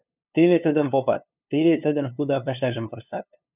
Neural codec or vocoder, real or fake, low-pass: codec, 16 kHz, 2 kbps, FunCodec, trained on LibriTTS, 25 frames a second; fake; 3.6 kHz